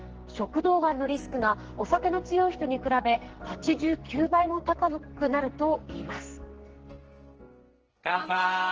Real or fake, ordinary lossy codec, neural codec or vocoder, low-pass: fake; Opus, 16 kbps; codec, 44.1 kHz, 2.6 kbps, SNAC; 7.2 kHz